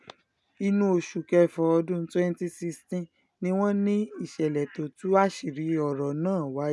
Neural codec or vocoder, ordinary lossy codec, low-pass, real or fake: none; none; none; real